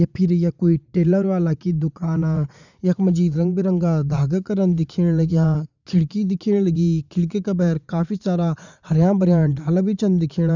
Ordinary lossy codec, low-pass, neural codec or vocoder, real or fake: none; 7.2 kHz; vocoder, 44.1 kHz, 80 mel bands, Vocos; fake